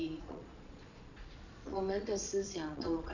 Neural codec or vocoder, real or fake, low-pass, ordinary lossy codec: vocoder, 22.05 kHz, 80 mel bands, Vocos; fake; 7.2 kHz; none